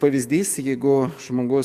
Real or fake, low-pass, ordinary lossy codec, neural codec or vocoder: fake; 14.4 kHz; Opus, 64 kbps; codec, 44.1 kHz, 7.8 kbps, DAC